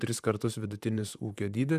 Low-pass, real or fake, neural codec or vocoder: 14.4 kHz; fake; vocoder, 44.1 kHz, 128 mel bands, Pupu-Vocoder